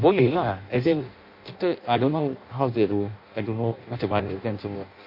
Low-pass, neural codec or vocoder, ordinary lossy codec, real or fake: 5.4 kHz; codec, 16 kHz in and 24 kHz out, 0.6 kbps, FireRedTTS-2 codec; MP3, 32 kbps; fake